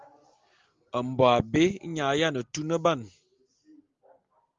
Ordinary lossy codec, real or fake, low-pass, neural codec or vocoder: Opus, 32 kbps; real; 7.2 kHz; none